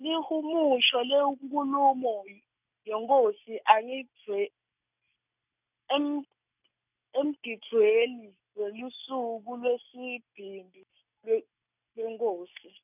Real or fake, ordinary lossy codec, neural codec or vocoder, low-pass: real; none; none; 3.6 kHz